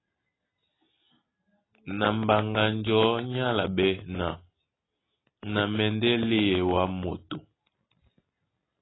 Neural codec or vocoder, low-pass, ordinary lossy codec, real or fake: none; 7.2 kHz; AAC, 16 kbps; real